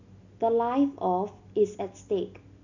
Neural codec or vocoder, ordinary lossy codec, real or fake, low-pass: none; none; real; 7.2 kHz